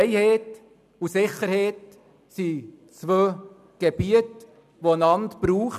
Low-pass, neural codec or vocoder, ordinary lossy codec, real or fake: 14.4 kHz; none; none; real